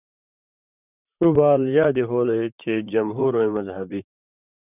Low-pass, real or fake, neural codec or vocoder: 3.6 kHz; fake; vocoder, 44.1 kHz, 128 mel bands, Pupu-Vocoder